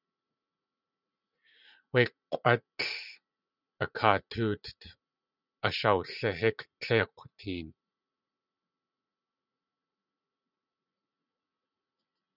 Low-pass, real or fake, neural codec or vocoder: 5.4 kHz; fake; vocoder, 44.1 kHz, 80 mel bands, Vocos